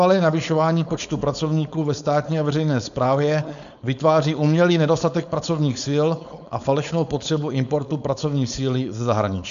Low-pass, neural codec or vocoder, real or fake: 7.2 kHz; codec, 16 kHz, 4.8 kbps, FACodec; fake